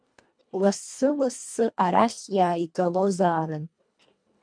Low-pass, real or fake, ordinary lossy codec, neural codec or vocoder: 9.9 kHz; fake; MP3, 64 kbps; codec, 24 kHz, 1.5 kbps, HILCodec